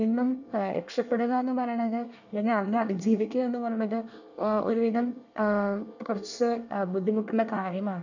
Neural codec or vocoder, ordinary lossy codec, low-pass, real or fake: codec, 24 kHz, 1 kbps, SNAC; none; 7.2 kHz; fake